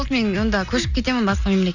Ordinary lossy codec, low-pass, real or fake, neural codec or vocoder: none; 7.2 kHz; real; none